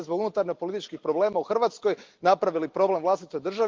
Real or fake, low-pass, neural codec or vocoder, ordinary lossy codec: real; 7.2 kHz; none; Opus, 32 kbps